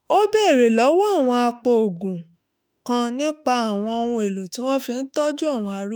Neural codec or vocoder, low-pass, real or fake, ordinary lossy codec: autoencoder, 48 kHz, 32 numbers a frame, DAC-VAE, trained on Japanese speech; none; fake; none